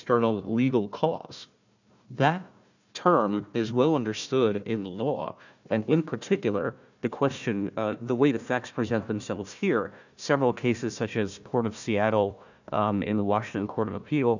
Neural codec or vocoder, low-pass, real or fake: codec, 16 kHz, 1 kbps, FunCodec, trained on Chinese and English, 50 frames a second; 7.2 kHz; fake